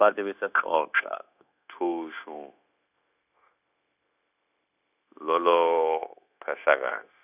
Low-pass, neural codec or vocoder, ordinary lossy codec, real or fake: 3.6 kHz; codec, 16 kHz, 0.9 kbps, LongCat-Audio-Codec; none; fake